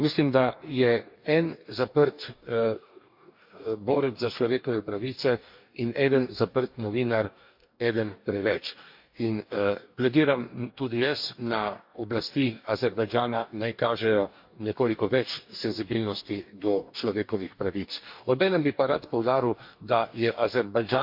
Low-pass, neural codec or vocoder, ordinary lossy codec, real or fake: 5.4 kHz; codec, 44.1 kHz, 2.6 kbps, DAC; MP3, 48 kbps; fake